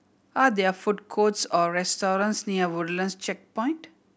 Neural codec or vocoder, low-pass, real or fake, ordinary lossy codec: none; none; real; none